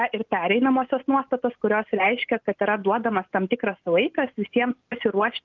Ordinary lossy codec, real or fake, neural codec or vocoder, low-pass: Opus, 24 kbps; real; none; 7.2 kHz